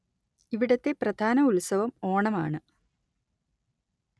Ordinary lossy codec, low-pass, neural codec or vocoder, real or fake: none; none; none; real